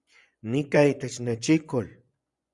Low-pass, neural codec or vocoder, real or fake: 10.8 kHz; vocoder, 24 kHz, 100 mel bands, Vocos; fake